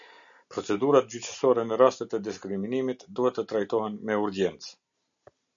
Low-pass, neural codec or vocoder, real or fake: 7.2 kHz; none; real